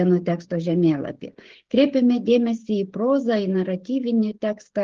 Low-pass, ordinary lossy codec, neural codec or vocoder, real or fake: 7.2 kHz; Opus, 16 kbps; codec, 16 kHz, 16 kbps, FreqCodec, smaller model; fake